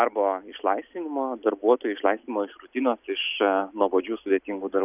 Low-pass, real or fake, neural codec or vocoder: 3.6 kHz; real; none